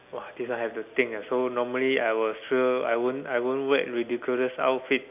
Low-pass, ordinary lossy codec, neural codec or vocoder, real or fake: 3.6 kHz; none; none; real